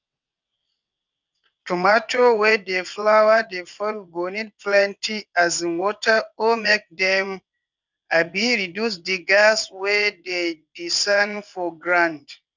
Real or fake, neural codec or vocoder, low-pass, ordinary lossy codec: fake; vocoder, 22.05 kHz, 80 mel bands, WaveNeXt; 7.2 kHz; none